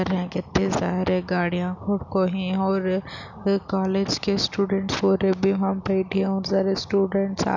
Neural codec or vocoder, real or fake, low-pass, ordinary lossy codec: none; real; 7.2 kHz; none